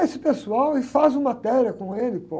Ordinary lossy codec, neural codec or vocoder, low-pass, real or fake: none; none; none; real